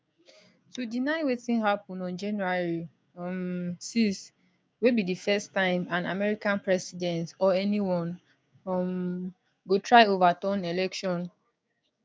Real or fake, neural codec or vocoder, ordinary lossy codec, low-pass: fake; codec, 16 kHz, 6 kbps, DAC; none; none